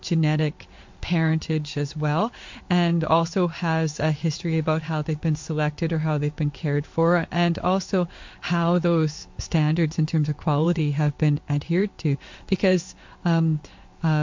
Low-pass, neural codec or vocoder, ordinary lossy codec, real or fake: 7.2 kHz; codec, 16 kHz in and 24 kHz out, 1 kbps, XY-Tokenizer; MP3, 48 kbps; fake